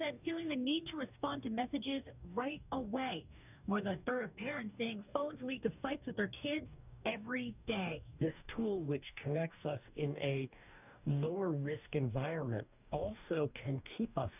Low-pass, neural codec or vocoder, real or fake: 3.6 kHz; codec, 44.1 kHz, 2.6 kbps, DAC; fake